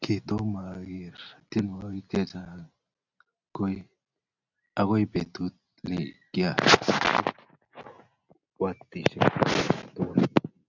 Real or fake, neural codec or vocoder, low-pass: real; none; 7.2 kHz